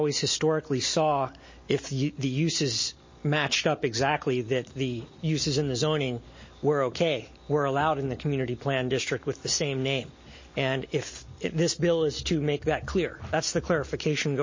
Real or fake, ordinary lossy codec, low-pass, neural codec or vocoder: real; MP3, 32 kbps; 7.2 kHz; none